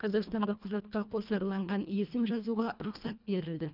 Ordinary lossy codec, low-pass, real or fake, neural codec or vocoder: none; 5.4 kHz; fake; codec, 24 kHz, 1.5 kbps, HILCodec